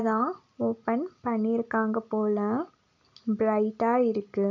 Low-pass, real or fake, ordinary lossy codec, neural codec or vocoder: 7.2 kHz; real; none; none